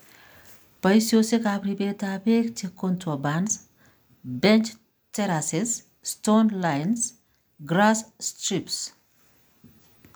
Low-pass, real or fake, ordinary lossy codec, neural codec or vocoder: none; real; none; none